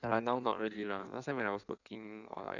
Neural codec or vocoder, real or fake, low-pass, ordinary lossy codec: codec, 16 kHz in and 24 kHz out, 1.1 kbps, FireRedTTS-2 codec; fake; 7.2 kHz; none